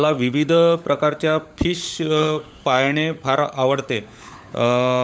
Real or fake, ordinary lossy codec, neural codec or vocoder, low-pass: fake; none; codec, 16 kHz, 16 kbps, FunCodec, trained on LibriTTS, 50 frames a second; none